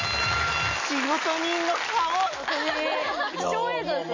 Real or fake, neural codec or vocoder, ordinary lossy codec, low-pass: real; none; MP3, 32 kbps; 7.2 kHz